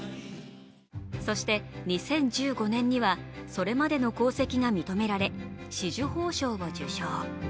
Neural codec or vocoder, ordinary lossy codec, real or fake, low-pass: none; none; real; none